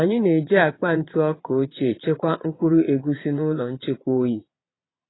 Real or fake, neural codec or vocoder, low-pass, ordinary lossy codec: fake; vocoder, 22.05 kHz, 80 mel bands, Vocos; 7.2 kHz; AAC, 16 kbps